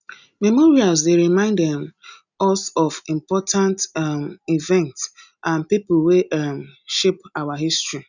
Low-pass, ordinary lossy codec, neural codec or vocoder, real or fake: 7.2 kHz; none; none; real